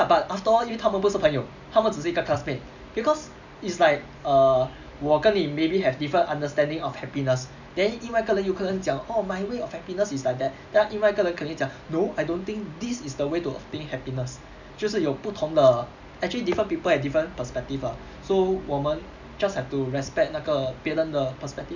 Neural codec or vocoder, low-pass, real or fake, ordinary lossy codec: none; 7.2 kHz; real; none